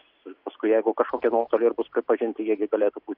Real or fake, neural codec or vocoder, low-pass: real; none; 5.4 kHz